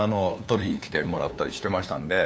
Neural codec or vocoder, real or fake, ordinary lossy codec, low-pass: codec, 16 kHz, 2 kbps, FunCodec, trained on LibriTTS, 25 frames a second; fake; none; none